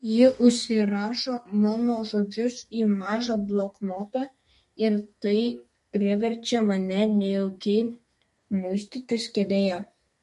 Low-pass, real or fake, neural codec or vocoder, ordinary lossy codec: 14.4 kHz; fake; codec, 32 kHz, 1.9 kbps, SNAC; MP3, 48 kbps